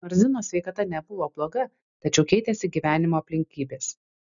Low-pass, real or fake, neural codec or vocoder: 7.2 kHz; real; none